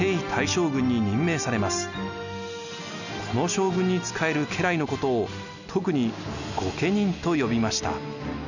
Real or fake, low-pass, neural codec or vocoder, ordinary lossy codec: real; 7.2 kHz; none; none